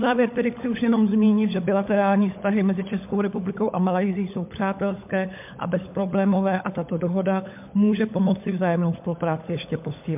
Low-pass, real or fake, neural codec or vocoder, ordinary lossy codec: 3.6 kHz; fake; codec, 16 kHz, 16 kbps, FunCodec, trained on LibriTTS, 50 frames a second; MP3, 32 kbps